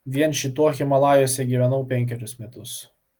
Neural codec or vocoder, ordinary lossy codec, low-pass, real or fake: none; Opus, 24 kbps; 19.8 kHz; real